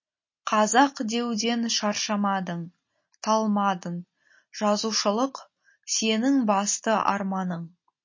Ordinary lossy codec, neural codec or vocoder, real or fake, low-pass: MP3, 32 kbps; none; real; 7.2 kHz